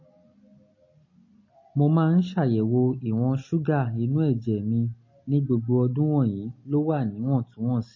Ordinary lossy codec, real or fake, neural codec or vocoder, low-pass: MP3, 32 kbps; real; none; 7.2 kHz